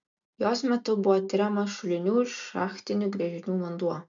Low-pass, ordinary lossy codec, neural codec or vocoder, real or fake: 7.2 kHz; MP3, 64 kbps; none; real